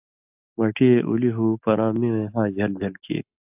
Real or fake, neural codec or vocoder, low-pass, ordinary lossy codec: real; none; 3.6 kHz; AAC, 32 kbps